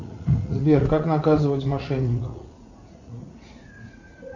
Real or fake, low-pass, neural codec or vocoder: fake; 7.2 kHz; vocoder, 44.1 kHz, 80 mel bands, Vocos